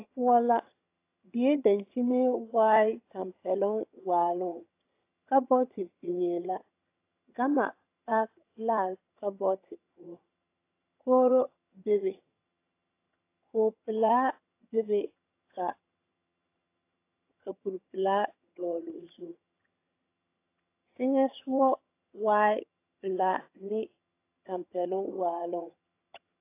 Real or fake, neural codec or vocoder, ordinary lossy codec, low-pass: fake; vocoder, 22.05 kHz, 80 mel bands, HiFi-GAN; AAC, 24 kbps; 3.6 kHz